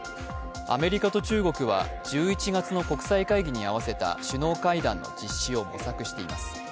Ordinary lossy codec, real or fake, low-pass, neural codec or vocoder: none; real; none; none